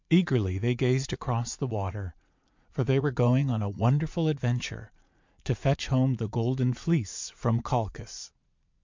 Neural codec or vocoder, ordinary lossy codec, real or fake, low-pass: vocoder, 44.1 kHz, 80 mel bands, Vocos; MP3, 64 kbps; fake; 7.2 kHz